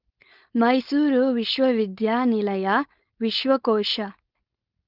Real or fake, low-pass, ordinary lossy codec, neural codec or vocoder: fake; 5.4 kHz; Opus, 32 kbps; codec, 16 kHz, 4.8 kbps, FACodec